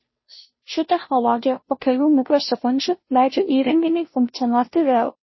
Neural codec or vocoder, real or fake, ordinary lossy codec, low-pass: codec, 16 kHz, 0.5 kbps, FunCodec, trained on Chinese and English, 25 frames a second; fake; MP3, 24 kbps; 7.2 kHz